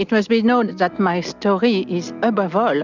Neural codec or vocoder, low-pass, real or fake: none; 7.2 kHz; real